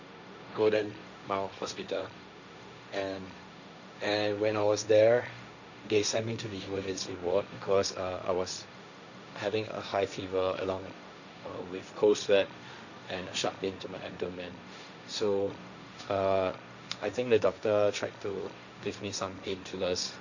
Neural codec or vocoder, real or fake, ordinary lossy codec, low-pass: codec, 16 kHz, 1.1 kbps, Voila-Tokenizer; fake; none; 7.2 kHz